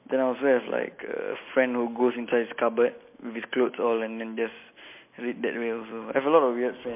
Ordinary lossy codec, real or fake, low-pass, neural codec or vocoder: MP3, 24 kbps; real; 3.6 kHz; none